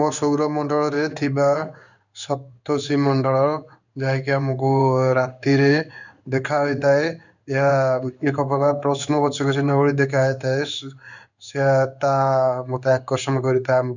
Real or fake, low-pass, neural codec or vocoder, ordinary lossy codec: fake; 7.2 kHz; codec, 16 kHz in and 24 kHz out, 1 kbps, XY-Tokenizer; none